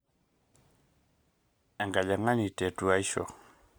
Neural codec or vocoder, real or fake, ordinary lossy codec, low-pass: none; real; none; none